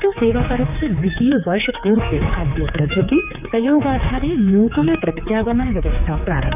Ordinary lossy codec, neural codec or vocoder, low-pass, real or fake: none; codec, 16 kHz, 4 kbps, X-Codec, HuBERT features, trained on general audio; 3.6 kHz; fake